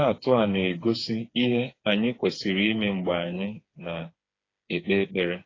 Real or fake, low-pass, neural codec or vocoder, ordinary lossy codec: fake; 7.2 kHz; codec, 16 kHz, 4 kbps, FreqCodec, smaller model; AAC, 32 kbps